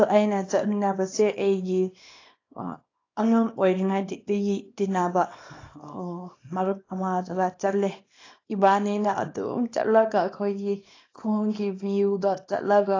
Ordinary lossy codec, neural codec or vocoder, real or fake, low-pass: AAC, 32 kbps; codec, 24 kHz, 0.9 kbps, WavTokenizer, small release; fake; 7.2 kHz